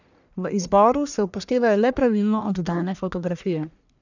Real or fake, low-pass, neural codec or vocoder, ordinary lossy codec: fake; 7.2 kHz; codec, 44.1 kHz, 1.7 kbps, Pupu-Codec; none